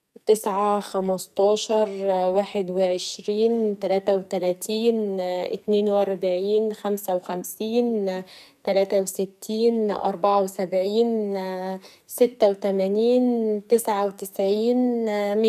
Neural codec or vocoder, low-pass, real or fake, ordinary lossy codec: codec, 44.1 kHz, 2.6 kbps, SNAC; 14.4 kHz; fake; none